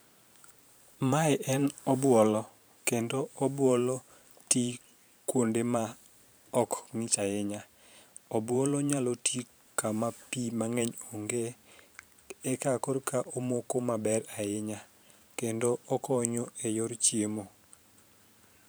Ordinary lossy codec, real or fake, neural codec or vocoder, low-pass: none; fake; vocoder, 44.1 kHz, 128 mel bands every 512 samples, BigVGAN v2; none